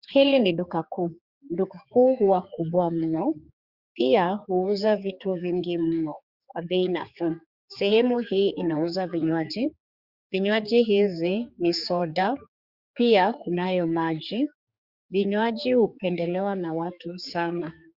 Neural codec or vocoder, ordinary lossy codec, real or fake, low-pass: codec, 16 kHz, 4 kbps, X-Codec, HuBERT features, trained on general audio; Opus, 64 kbps; fake; 5.4 kHz